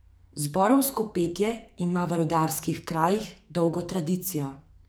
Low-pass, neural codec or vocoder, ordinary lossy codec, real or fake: none; codec, 44.1 kHz, 2.6 kbps, SNAC; none; fake